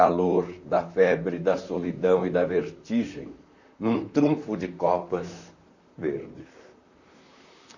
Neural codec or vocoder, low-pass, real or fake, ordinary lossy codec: vocoder, 44.1 kHz, 128 mel bands, Pupu-Vocoder; 7.2 kHz; fake; none